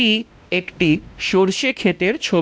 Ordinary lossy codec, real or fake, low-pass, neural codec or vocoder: none; fake; none; codec, 16 kHz, 1 kbps, X-Codec, WavLM features, trained on Multilingual LibriSpeech